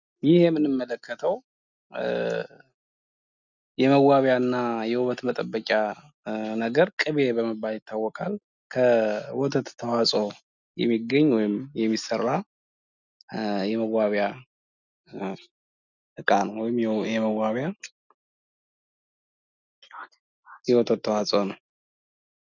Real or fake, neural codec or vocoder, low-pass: real; none; 7.2 kHz